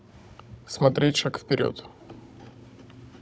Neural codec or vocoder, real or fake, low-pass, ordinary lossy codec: codec, 16 kHz, 16 kbps, FunCodec, trained on Chinese and English, 50 frames a second; fake; none; none